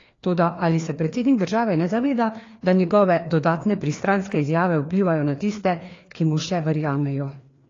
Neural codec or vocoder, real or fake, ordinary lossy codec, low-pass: codec, 16 kHz, 2 kbps, FreqCodec, larger model; fake; AAC, 32 kbps; 7.2 kHz